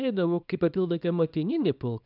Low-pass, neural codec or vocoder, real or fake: 5.4 kHz; codec, 24 kHz, 0.9 kbps, WavTokenizer, small release; fake